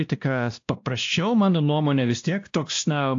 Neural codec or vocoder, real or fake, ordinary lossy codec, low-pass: codec, 16 kHz, 1 kbps, X-Codec, WavLM features, trained on Multilingual LibriSpeech; fake; AAC, 48 kbps; 7.2 kHz